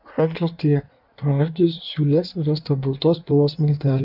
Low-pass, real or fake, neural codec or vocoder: 5.4 kHz; fake; codec, 16 kHz in and 24 kHz out, 1.1 kbps, FireRedTTS-2 codec